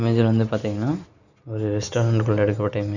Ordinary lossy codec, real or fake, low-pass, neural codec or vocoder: none; real; 7.2 kHz; none